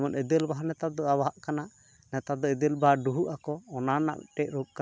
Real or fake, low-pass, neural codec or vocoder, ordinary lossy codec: real; none; none; none